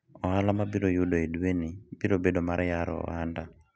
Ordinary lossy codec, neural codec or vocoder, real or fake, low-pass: none; none; real; none